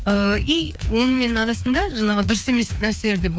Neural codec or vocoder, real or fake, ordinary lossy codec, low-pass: codec, 16 kHz, 2 kbps, FreqCodec, larger model; fake; none; none